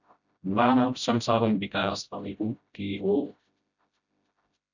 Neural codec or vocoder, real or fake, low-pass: codec, 16 kHz, 0.5 kbps, FreqCodec, smaller model; fake; 7.2 kHz